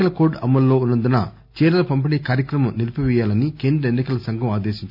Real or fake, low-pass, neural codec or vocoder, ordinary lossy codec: real; 5.4 kHz; none; none